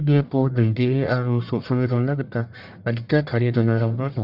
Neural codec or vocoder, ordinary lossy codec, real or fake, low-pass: codec, 44.1 kHz, 1.7 kbps, Pupu-Codec; none; fake; 5.4 kHz